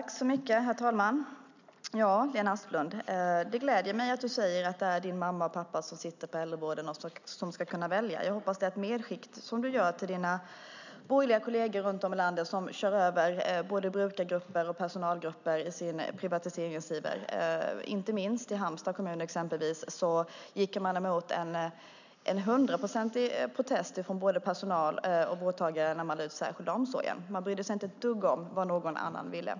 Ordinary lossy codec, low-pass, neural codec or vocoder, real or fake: none; 7.2 kHz; none; real